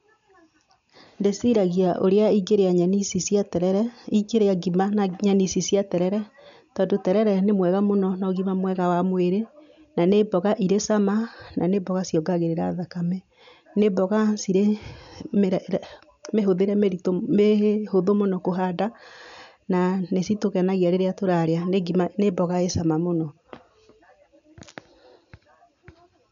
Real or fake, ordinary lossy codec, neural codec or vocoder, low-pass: real; none; none; 7.2 kHz